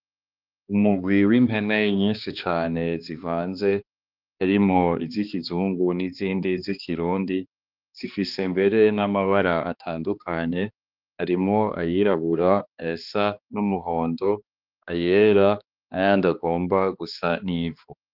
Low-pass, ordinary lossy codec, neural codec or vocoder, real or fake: 5.4 kHz; Opus, 24 kbps; codec, 16 kHz, 2 kbps, X-Codec, HuBERT features, trained on balanced general audio; fake